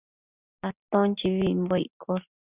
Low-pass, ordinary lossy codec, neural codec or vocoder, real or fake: 3.6 kHz; Opus, 64 kbps; none; real